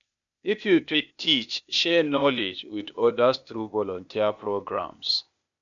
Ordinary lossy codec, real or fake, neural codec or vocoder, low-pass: none; fake; codec, 16 kHz, 0.8 kbps, ZipCodec; 7.2 kHz